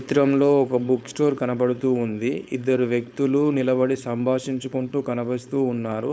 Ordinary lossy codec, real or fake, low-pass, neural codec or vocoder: none; fake; none; codec, 16 kHz, 4.8 kbps, FACodec